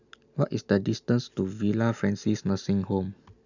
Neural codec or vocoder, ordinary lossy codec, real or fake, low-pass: none; none; real; 7.2 kHz